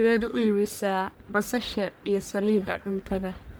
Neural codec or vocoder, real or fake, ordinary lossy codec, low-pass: codec, 44.1 kHz, 1.7 kbps, Pupu-Codec; fake; none; none